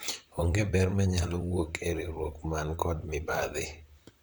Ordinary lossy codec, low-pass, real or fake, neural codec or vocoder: none; none; fake; vocoder, 44.1 kHz, 128 mel bands, Pupu-Vocoder